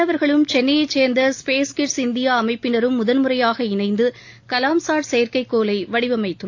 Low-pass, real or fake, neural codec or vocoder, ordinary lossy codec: 7.2 kHz; real; none; AAC, 48 kbps